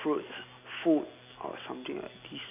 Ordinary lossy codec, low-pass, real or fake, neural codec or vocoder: none; 3.6 kHz; real; none